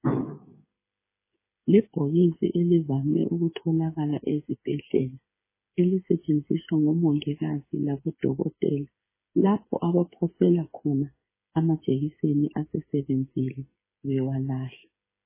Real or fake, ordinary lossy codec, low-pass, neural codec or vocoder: fake; MP3, 16 kbps; 3.6 kHz; codec, 16 kHz, 8 kbps, FreqCodec, smaller model